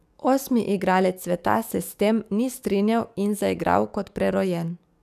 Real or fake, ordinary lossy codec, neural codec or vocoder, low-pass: fake; none; codec, 44.1 kHz, 7.8 kbps, DAC; 14.4 kHz